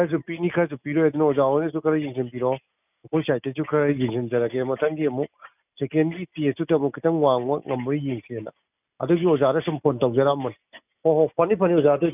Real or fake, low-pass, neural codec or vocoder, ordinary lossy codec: real; 3.6 kHz; none; none